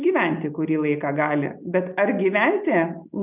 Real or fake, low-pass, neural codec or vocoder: real; 3.6 kHz; none